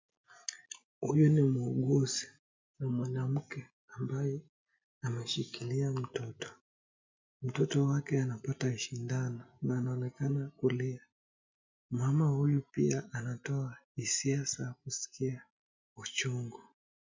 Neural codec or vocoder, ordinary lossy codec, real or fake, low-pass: none; MP3, 64 kbps; real; 7.2 kHz